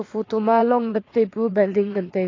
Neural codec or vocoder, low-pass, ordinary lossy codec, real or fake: vocoder, 22.05 kHz, 80 mel bands, WaveNeXt; 7.2 kHz; AAC, 32 kbps; fake